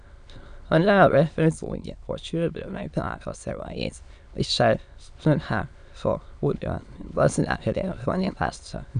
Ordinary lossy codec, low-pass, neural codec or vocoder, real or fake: none; 9.9 kHz; autoencoder, 22.05 kHz, a latent of 192 numbers a frame, VITS, trained on many speakers; fake